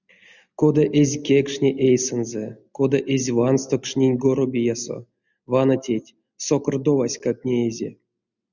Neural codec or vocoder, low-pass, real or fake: none; 7.2 kHz; real